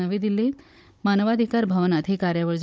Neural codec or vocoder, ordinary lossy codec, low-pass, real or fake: codec, 16 kHz, 16 kbps, FunCodec, trained on Chinese and English, 50 frames a second; none; none; fake